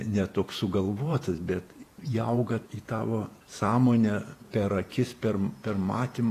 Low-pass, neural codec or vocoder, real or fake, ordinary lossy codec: 14.4 kHz; vocoder, 48 kHz, 128 mel bands, Vocos; fake; AAC, 48 kbps